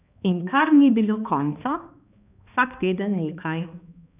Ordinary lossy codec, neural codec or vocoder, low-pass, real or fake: none; codec, 16 kHz, 2 kbps, X-Codec, HuBERT features, trained on balanced general audio; 3.6 kHz; fake